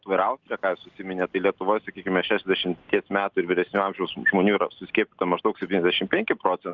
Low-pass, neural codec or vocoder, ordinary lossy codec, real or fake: 7.2 kHz; none; Opus, 24 kbps; real